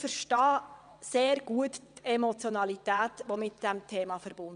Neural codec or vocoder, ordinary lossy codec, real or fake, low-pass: vocoder, 22.05 kHz, 80 mel bands, WaveNeXt; none; fake; 9.9 kHz